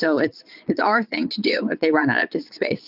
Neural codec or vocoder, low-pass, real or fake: vocoder, 22.05 kHz, 80 mel bands, Vocos; 5.4 kHz; fake